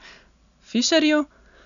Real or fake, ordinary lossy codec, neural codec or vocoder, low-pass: real; none; none; 7.2 kHz